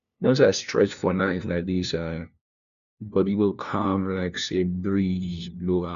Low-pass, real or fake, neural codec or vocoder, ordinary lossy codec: 7.2 kHz; fake; codec, 16 kHz, 1 kbps, FunCodec, trained on LibriTTS, 50 frames a second; none